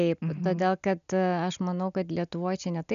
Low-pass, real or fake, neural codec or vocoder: 7.2 kHz; real; none